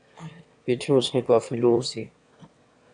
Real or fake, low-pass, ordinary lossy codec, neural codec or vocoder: fake; 9.9 kHz; AAC, 64 kbps; autoencoder, 22.05 kHz, a latent of 192 numbers a frame, VITS, trained on one speaker